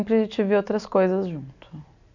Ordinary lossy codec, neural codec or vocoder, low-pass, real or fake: none; none; 7.2 kHz; real